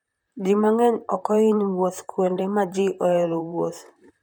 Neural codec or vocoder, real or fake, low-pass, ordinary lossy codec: vocoder, 44.1 kHz, 128 mel bands, Pupu-Vocoder; fake; 19.8 kHz; none